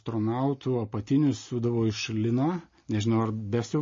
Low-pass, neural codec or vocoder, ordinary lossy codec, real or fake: 7.2 kHz; none; MP3, 32 kbps; real